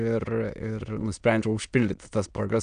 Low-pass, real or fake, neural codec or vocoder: 9.9 kHz; fake; autoencoder, 22.05 kHz, a latent of 192 numbers a frame, VITS, trained on many speakers